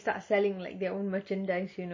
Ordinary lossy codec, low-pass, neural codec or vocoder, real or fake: MP3, 32 kbps; 7.2 kHz; none; real